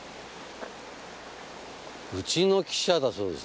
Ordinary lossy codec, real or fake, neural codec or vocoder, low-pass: none; real; none; none